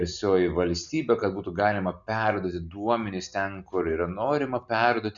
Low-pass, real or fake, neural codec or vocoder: 7.2 kHz; real; none